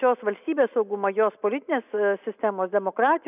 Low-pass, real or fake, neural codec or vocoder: 3.6 kHz; real; none